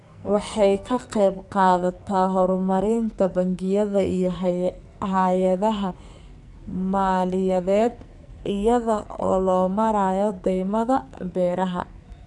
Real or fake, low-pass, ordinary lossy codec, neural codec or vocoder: fake; 10.8 kHz; none; codec, 44.1 kHz, 2.6 kbps, SNAC